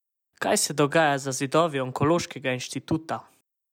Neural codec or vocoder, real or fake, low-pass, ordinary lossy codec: none; real; 19.8 kHz; none